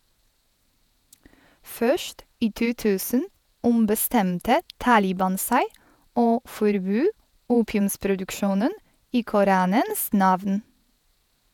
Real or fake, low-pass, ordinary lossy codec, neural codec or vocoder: fake; 19.8 kHz; none; vocoder, 48 kHz, 128 mel bands, Vocos